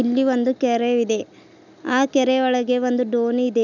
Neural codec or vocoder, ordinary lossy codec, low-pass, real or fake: none; none; 7.2 kHz; real